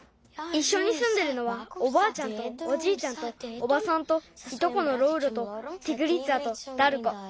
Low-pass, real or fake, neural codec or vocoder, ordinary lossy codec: none; real; none; none